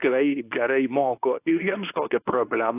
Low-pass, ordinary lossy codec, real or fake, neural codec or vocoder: 3.6 kHz; AAC, 24 kbps; fake; codec, 24 kHz, 0.9 kbps, WavTokenizer, medium speech release version 2